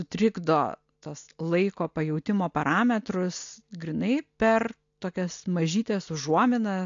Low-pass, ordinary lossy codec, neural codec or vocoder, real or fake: 7.2 kHz; AAC, 64 kbps; none; real